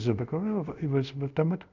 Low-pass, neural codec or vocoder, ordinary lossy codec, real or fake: 7.2 kHz; codec, 24 kHz, 0.5 kbps, DualCodec; none; fake